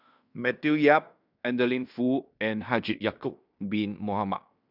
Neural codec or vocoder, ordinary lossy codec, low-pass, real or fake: codec, 16 kHz in and 24 kHz out, 0.9 kbps, LongCat-Audio-Codec, fine tuned four codebook decoder; none; 5.4 kHz; fake